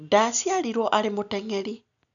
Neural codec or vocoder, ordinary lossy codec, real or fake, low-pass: none; none; real; 7.2 kHz